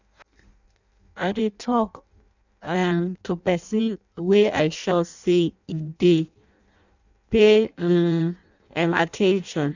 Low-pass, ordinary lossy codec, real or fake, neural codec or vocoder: 7.2 kHz; none; fake; codec, 16 kHz in and 24 kHz out, 0.6 kbps, FireRedTTS-2 codec